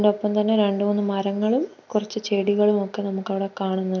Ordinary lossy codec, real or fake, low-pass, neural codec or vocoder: none; real; 7.2 kHz; none